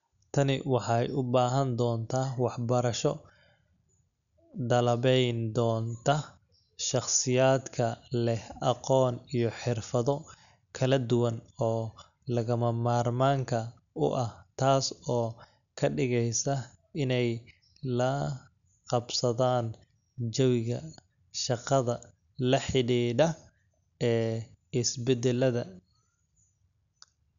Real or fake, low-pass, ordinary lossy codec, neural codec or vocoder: real; 7.2 kHz; none; none